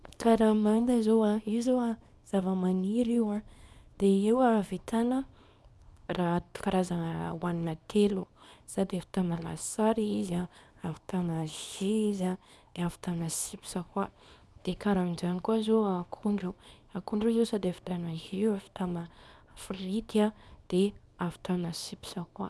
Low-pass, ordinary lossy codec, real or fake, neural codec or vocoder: none; none; fake; codec, 24 kHz, 0.9 kbps, WavTokenizer, small release